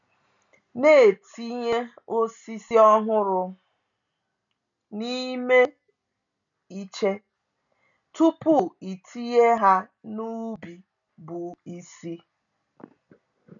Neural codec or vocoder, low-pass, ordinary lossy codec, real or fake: none; 7.2 kHz; none; real